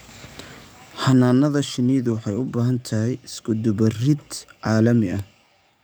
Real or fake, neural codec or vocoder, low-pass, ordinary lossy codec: fake; codec, 44.1 kHz, 7.8 kbps, DAC; none; none